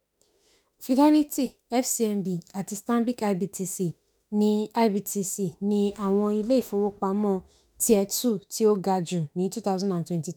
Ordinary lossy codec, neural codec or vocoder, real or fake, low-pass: none; autoencoder, 48 kHz, 32 numbers a frame, DAC-VAE, trained on Japanese speech; fake; none